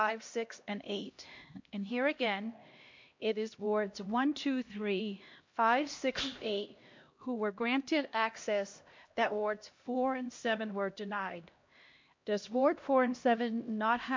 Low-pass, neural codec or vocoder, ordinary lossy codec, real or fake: 7.2 kHz; codec, 16 kHz, 1 kbps, X-Codec, HuBERT features, trained on LibriSpeech; MP3, 48 kbps; fake